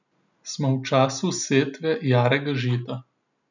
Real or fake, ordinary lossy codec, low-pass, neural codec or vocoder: real; none; 7.2 kHz; none